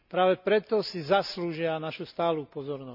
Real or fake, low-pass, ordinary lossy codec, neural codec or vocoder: real; 5.4 kHz; none; none